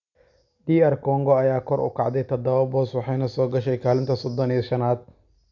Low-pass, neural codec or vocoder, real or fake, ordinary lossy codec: 7.2 kHz; none; real; none